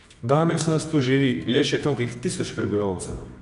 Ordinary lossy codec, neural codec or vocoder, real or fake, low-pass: none; codec, 24 kHz, 0.9 kbps, WavTokenizer, medium music audio release; fake; 10.8 kHz